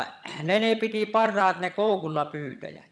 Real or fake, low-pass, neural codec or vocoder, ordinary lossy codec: fake; none; vocoder, 22.05 kHz, 80 mel bands, HiFi-GAN; none